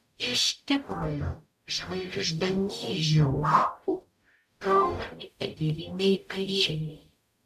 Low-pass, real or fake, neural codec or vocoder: 14.4 kHz; fake; codec, 44.1 kHz, 0.9 kbps, DAC